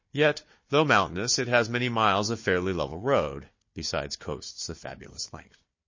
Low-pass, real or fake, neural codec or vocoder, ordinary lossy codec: 7.2 kHz; fake; codec, 16 kHz, 4 kbps, FunCodec, trained on Chinese and English, 50 frames a second; MP3, 32 kbps